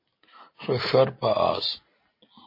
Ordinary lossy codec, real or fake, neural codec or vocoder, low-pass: MP3, 24 kbps; real; none; 5.4 kHz